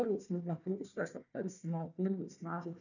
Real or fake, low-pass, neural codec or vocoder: fake; 7.2 kHz; codec, 16 kHz, 1 kbps, FunCodec, trained on Chinese and English, 50 frames a second